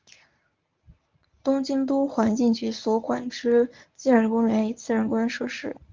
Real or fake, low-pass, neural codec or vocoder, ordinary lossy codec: fake; 7.2 kHz; codec, 24 kHz, 0.9 kbps, WavTokenizer, medium speech release version 1; Opus, 24 kbps